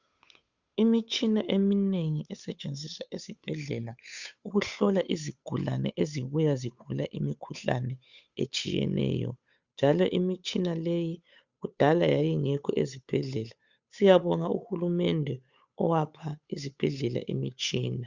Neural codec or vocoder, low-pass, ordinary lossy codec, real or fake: codec, 16 kHz, 8 kbps, FunCodec, trained on Chinese and English, 25 frames a second; 7.2 kHz; Opus, 64 kbps; fake